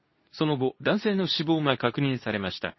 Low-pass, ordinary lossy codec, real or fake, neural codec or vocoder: 7.2 kHz; MP3, 24 kbps; fake; codec, 24 kHz, 0.9 kbps, WavTokenizer, medium speech release version 2